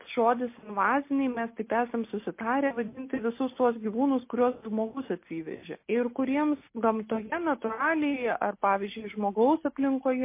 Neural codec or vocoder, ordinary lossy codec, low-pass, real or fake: none; MP3, 24 kbps; 3.6 kHz; real